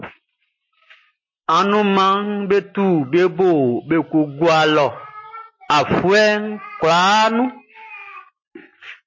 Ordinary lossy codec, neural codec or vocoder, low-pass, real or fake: MP3, 32 kbps; none; 7.2 kHz; real